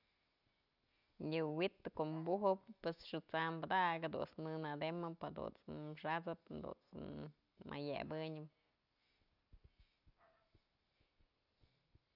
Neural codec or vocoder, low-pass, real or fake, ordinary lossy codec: none; 5.4 kHz; real; none